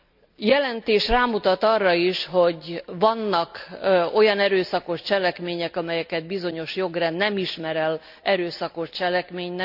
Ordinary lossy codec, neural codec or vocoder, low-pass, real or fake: none; none; 5.4 kHz; real